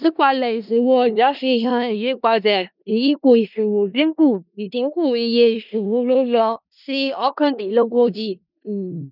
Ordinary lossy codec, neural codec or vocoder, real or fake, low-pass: none; codec, 16 kHz in and 24 kHz out, 0.4 kbps, LongCat-Audio-Codec, four codebook decoder; fake; 5.4 kHz